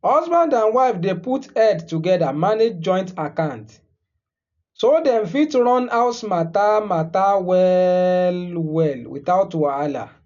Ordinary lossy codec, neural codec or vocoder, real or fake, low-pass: none; none; real; 7.2 kHz